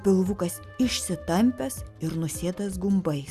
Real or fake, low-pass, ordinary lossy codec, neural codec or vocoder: real; 14.4 kHz; Opus, 64 kbps; none